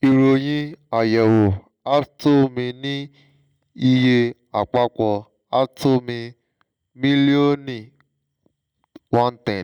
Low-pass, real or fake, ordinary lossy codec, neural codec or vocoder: 19.8 kHz; real; none; none